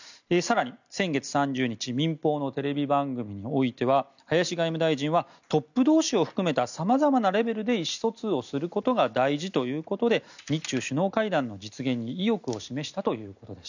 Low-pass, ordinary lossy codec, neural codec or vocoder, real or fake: 7.2 kHz; none; none; real